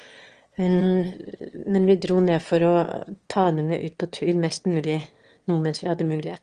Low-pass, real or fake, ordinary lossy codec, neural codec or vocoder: 9.9 kHz; fake; Opus, 24 kbps; autoencoder, 22.05 kHz, a latent of 192 numbers a frame, VITS, trained on one speaker